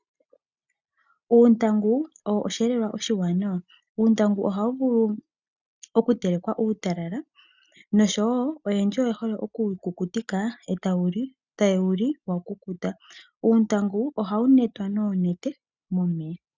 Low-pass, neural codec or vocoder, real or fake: 7.2 kHz; none; real